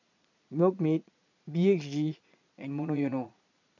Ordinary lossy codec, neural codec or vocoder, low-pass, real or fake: none; vocoder, 22.05 kHz, 80 mel bands, Vocos; 7.2 kHz; fake